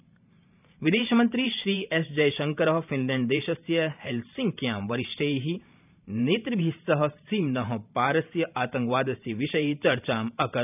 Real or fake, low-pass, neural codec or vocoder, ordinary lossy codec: fake; 3.6 kHz; vocoder, 44.1 kHz, 128 mel bands every 512 samples, BigVGAN v2; none